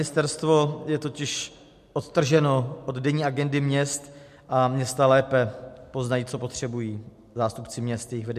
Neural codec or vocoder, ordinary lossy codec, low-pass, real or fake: none; MP3, 64 kbps; 14.4 kHz; real